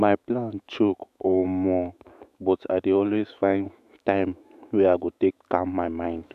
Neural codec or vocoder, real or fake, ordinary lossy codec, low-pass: autoencoder, 48 kHz, 128 numbers a frame, DAC-VAE, trained on Japanese speech; fake; none; 14.4 kHz